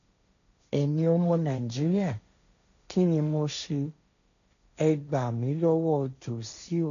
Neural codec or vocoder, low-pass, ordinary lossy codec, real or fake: codec, 16 kHz, 1.1 kbps, Voila-Tokenizer; 7.2 kHz; none; fake